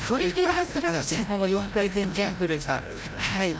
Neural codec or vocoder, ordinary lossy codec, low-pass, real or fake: codec, 16 kHz, 0.5 kbps, FreqCodec, larger model; none; none; fake